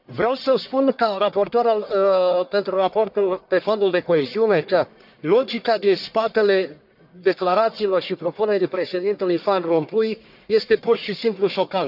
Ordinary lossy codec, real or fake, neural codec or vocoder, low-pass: none; fake; codec, 44.1 kHz, 1.7 kbps, Pupu-Codec; 5.4 kHz